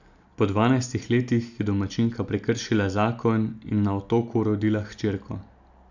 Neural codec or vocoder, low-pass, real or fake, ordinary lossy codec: none; 7.2 kHz; real; none